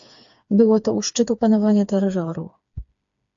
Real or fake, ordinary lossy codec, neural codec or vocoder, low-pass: fake; AAC, 64 kbps; codec, 16 kHz, 4 kbps, FreqCodec, smaller model; 7.2 kHz